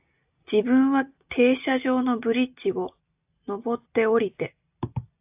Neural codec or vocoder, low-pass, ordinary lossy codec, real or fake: none; 3.6 kHz; AAC, 32 kbps; real